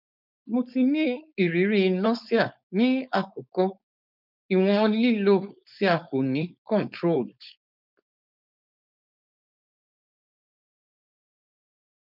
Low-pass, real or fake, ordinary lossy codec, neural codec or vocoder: 5.4 kHz; fake; none; codec, 16 kHz, 4.8 kbps, FACodec